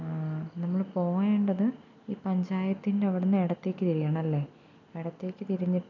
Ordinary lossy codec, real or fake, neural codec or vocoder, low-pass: none; real; none; 7.2 kHz